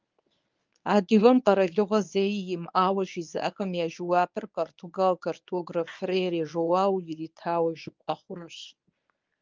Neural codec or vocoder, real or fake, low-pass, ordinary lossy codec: codec, 24 kHz, 0.9 kbps, WavTokenizer, medium speech release version 1; fake; 7.2 kHz; Opus, 24 kbps